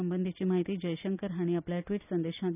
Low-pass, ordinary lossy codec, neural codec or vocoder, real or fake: 3.6 kHz; none; none; real